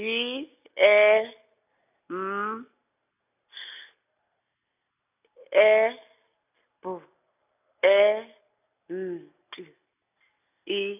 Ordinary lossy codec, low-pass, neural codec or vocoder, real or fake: none; 3.6 kHz; none; real